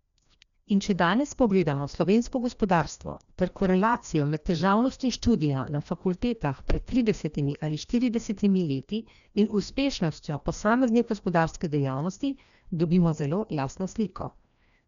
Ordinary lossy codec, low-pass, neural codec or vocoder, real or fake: none; 7.2 kHz; codec, 16 kHz, 1 kbps, FreqCodec, larger model; fake